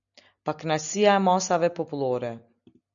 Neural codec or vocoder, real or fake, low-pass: none; real; 7.2 kHz